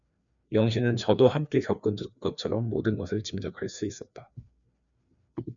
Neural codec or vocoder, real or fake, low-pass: codec, 16 kHz, 2 kbps, FreqCodec, larger model; fake; 7.2 kHz